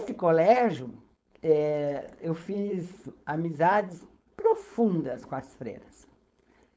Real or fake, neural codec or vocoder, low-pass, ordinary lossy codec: fake; codec, 16 kHz, 4.8 kbps, FACodec; none; none